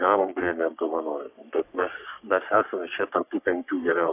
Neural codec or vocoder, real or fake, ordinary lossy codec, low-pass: codec, 44.1 kHz, 3.4 kbps, Pupu-Codec; fake; AAC, 32 kbps; 3.6 kHz